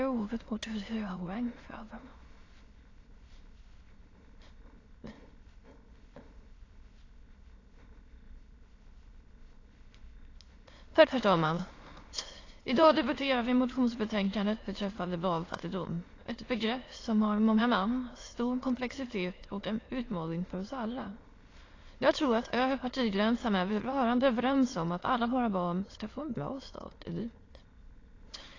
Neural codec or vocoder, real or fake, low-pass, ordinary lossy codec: autoencoder, 22.05 kHz, a latent of 192 numbers a frame, VITS, trained on many speakers; fake; 7.2 kHz; AAC, 32 kbps